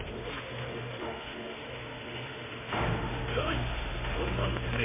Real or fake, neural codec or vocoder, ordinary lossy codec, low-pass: fake; autoencoder, 48 kHz, 32 numbers a frame, DAC-VAE, trained on Japanese speech; MP3, 16 kbps; 3.6 kHz